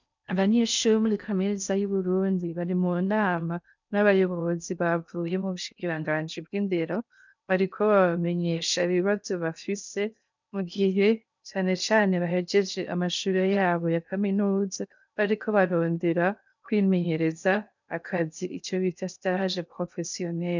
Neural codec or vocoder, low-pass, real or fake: codec, 16 kHz in and 24 kHz out, 0.6 kbps, FocalCodec, streaming, 4096 codes; 7.2 kHz; fake